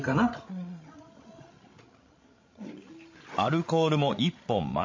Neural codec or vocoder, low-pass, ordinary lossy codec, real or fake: codec, 16 kHz, 16 kbps, FreqCodec, larger model; 7.2 kHz; MP3, 32 kbps; fake